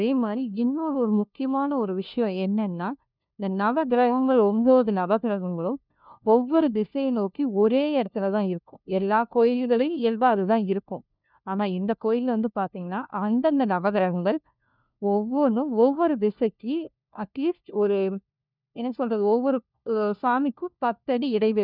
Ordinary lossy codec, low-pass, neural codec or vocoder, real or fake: none; 5.4 kHz; codec, 16 kHz, 1 kbps, FunCodec, trained on LibriTTS, 50 frames a second; fake